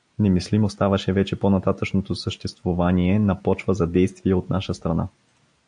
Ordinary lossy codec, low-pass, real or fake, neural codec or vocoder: AAC, 64 kbps; 9.9 kHz; real; none